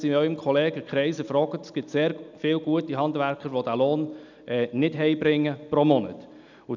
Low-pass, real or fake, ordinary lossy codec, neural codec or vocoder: 7.2 kHz; real; none; none